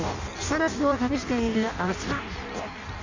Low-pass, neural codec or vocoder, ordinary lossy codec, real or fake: 7.2 kHz; codec, 16 kHz in and 24 kHz out, 0.6 kbps, FireRedTTS-2 codec; Opus, 64 kbps; fake